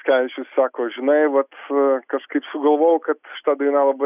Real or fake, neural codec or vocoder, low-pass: real; none; 3.6 kHz